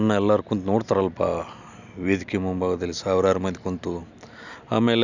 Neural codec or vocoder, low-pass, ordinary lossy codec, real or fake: none; 7.2 kHz; none; real